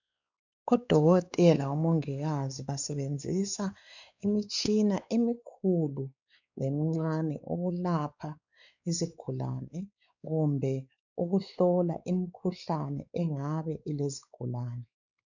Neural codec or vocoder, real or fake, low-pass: codec, 16 kHz, 4 kbps, X-Codec, WavLM features, trained on Multilingual LibriSpeech; fake; 7.2 kHz